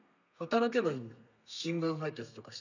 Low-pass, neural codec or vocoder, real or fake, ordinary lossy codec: 7.2 kHz; codec, 32 kHz, 1.9 kbps, SNAC; fake; none